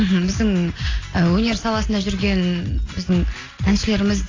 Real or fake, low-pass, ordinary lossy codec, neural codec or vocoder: real; 7.2 kHz; AAC, 32 kbps; none